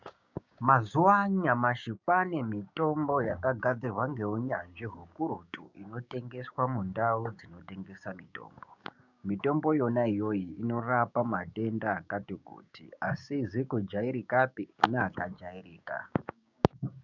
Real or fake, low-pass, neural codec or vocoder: fake; 7.2 kHz; codec, 16 kHz, 6 kbps, DAC